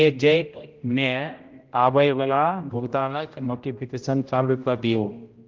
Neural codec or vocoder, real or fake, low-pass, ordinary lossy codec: codec, 16 kHz, 0.5 kbps, X-Codec, HuBERT features, trained on general audio; fake; 7.2 kHz; Opus, 32 kbps